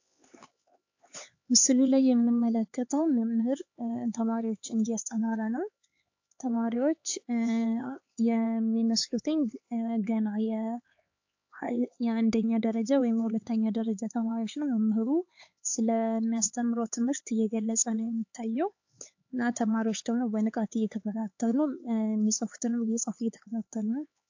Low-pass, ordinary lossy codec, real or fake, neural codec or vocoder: 7.2 kHz; AAC, 48 kbps; fake; codec, 16 kHz, 4 kbps, X-Codec, HuBERT features, trained on LibriSpeech